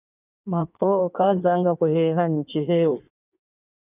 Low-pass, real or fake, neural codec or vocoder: 3.6 kHz; fake; codec, 16 kHz in and 24 kHz out, 1.1 kbps, FireRedTTS-2 codec